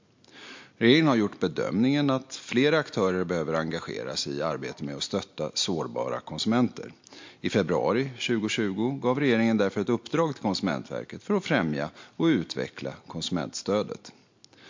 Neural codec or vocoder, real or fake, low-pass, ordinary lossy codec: none; real; 7.2 kHz; MP3, 48 kbps